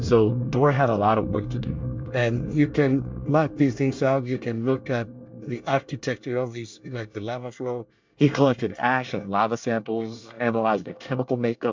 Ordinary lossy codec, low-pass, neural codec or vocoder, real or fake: MP3, 64 kbps; 7.2 kHz; codec, 24 kHz, 1 kbps, SNAC; fake